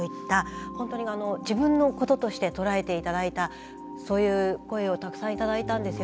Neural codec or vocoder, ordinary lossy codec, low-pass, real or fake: none; none; none; real